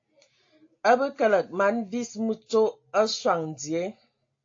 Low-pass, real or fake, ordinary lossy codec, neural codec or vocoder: 7.2 kHz; real; AAC, 48 kbps; none